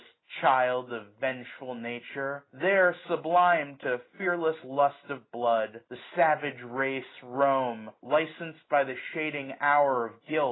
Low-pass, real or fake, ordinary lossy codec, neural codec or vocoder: 7.2 kHz; real; AAC, 16 kbps; none